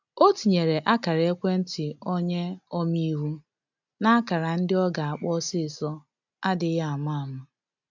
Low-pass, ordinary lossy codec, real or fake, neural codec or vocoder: 7.2 kHz; none; real; none